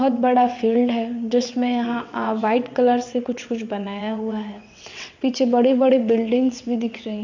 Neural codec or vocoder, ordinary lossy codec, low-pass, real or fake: vocoder, 22.05 kHz, 80 mel bands, WaveNeXt; MP3, 64 kbps; 7.2 kHz; fake